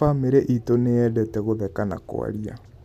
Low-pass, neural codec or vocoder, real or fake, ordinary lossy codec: 14.4 kHz; none; real; none